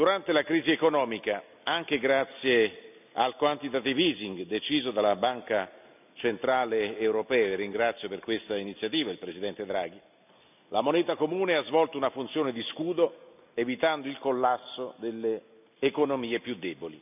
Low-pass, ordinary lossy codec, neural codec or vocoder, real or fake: 3.6 kHz; none; none; real